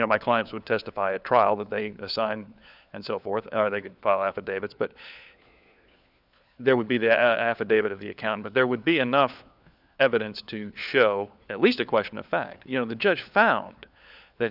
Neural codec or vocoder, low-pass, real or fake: codec, 16 kHz, 4 kbps, FunCodec, trained on LibriTTS, 50 frames a second; 5.4 kHz; fake